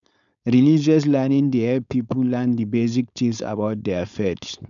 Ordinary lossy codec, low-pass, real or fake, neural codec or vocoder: none; 7.2 kHz; fake; codec, 16 kHz, 4.8 kbps, FACodec